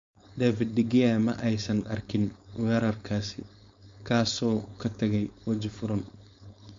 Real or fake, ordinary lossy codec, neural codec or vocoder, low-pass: fake; MP3, 48 kbps; codec, 16 kHz, 4.8 kbps, FACodec; 7.2 kHz